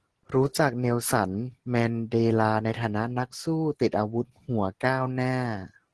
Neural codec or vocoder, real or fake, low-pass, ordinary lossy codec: none; real; 10.8 kHz; Opus, 16 kbps